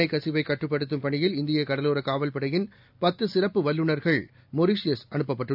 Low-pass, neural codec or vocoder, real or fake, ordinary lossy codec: 5.4 kHz; none; real; none